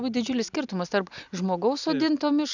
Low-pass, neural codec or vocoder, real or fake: 7.2 kHz; none; real